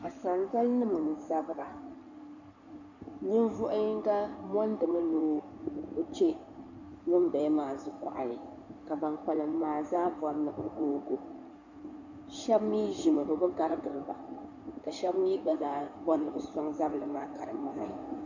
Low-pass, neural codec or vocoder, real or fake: 7.2 kHz; codec, 16 kHz in and 24 kHz out, 2.2 kbps, FireRedTTS-2 codec; fake